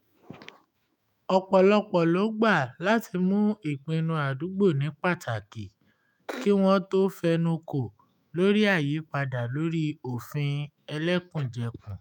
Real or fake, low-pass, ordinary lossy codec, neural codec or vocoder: fake; 19.8 kHz; none; autoencoder, 48 kHz, 128 numbers a frame, DAC-VAE, trained on Japanese speech